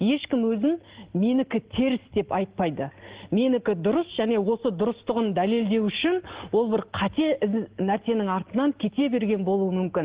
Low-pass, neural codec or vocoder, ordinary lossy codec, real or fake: 3.6 kHz; none; Opus, 16 kbps; real